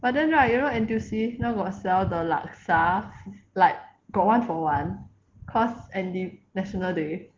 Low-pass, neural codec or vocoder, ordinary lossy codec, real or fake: 7.2 kHz; none; Opus, 16 kbps; real